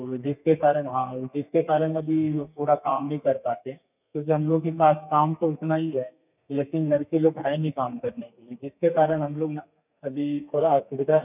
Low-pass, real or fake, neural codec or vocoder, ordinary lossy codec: 3.6 kHz; fake; codec, 32 kHz, 1.9 kbps, SNAC; none